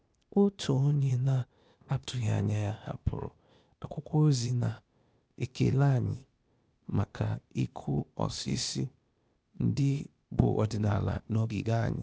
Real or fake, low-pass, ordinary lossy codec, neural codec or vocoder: fake; none; none; codec, 16 kHz, 0.8 kbps, ZipCodec